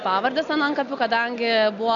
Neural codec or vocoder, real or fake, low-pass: none; real; 7.2 kHz